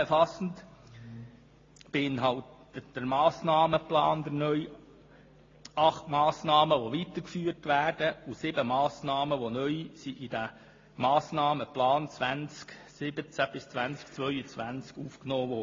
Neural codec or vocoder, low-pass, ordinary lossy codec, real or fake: none; 7.2 kHz; AAC, 32 kbps; real